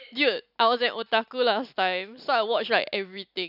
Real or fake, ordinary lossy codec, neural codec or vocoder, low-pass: real; none; none; 5.4 kHz